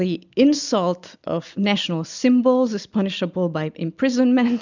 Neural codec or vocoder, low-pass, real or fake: none; 7.2 kHz; real